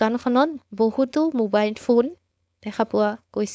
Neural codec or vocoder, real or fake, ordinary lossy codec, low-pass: codec, 16 kHz, 4.8 kbps, FACodec; fake; none; none